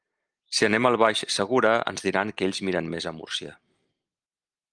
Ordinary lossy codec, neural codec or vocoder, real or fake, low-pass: Opus, 32 kbps; none; real; 9.9 kHz